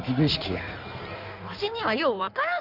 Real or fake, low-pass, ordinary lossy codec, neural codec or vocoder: fake; 5.4 kHz; none; codec, 16 kHz in and 24 kHz out, 1.1 kbps, FireRedTTS-2 codec